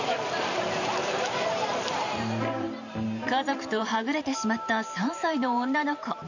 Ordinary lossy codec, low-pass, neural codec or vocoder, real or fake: none; 7.2 kHz; vocoder, 44.1 kHz, 128 mel bands every 512 samples, BigVGAN v2; fake